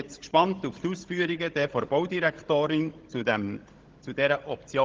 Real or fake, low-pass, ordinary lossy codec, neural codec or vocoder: fake; 7.2 kHz; Opus, 16 kbps; codec, 16 kHz, 16 kbps, FunCodec, trained on Chinese and English, 50 frames a second